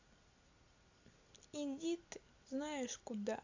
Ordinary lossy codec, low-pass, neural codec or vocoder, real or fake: AAC, 48 kbps; 7.2 kHz; none; real